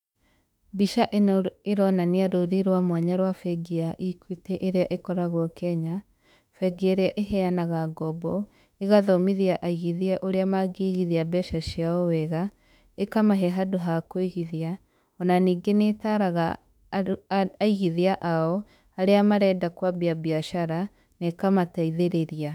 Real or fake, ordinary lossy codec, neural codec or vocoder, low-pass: fake; none; autoencoder, 48 kHz, 32 numbers a frame, DAC-VAE, trained on Japanese speech; 19.8 kHz